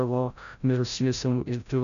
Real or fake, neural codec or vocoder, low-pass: fake; codec, 16 kHz, 0.5 kbps, FreqCodec, larger model; 7.2 kHz